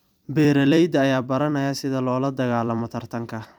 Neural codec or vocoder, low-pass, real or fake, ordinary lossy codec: vocoder, 44.1 kHz, 128 mel bands every 256 samples, BigVGAN v2; 19.8 kHz; fake; none